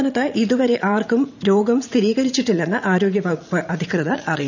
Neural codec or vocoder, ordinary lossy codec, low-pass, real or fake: vocoder, 44.1 kHz, 80 mel bands, Vocos; none; 7.2 kHz; fake